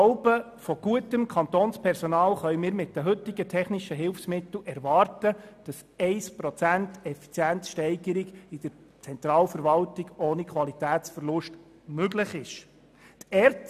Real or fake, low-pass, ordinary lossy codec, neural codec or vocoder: real; 14.4 kHz; none; none